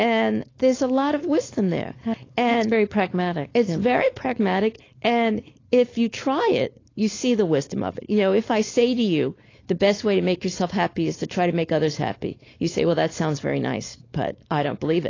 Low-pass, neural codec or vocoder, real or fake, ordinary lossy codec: 7.2 kHz; codec, 16 kHz, 4.8 kbps, FACodec; fake; AAC, 32 kbps